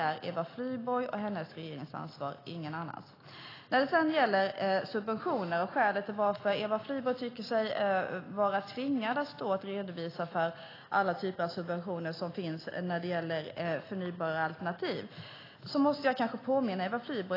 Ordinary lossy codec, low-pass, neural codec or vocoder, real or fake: AAC, 24 kbps; 5.4 kHz; none; real